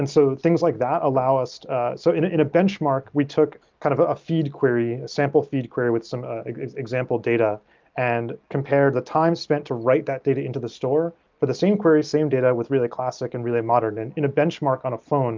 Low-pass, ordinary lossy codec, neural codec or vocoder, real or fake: 7.2 kHz; Opus, 24 kbps; none; real